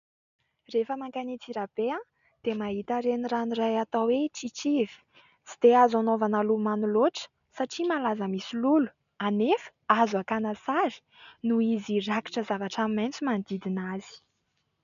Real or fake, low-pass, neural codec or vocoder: real; 7.2 kHz; none